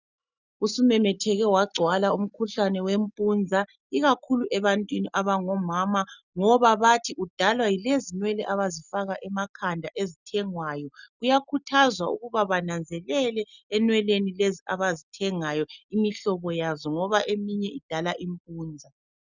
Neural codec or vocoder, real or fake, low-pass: none; real; 7.2 kHz